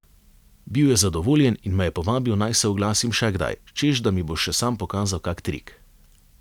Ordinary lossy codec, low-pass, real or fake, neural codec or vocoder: none; 19.8 kHz; real; none